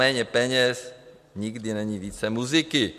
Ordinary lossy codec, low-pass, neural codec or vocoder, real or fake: MP3, 64 kbps; 14.4 kHz; none; real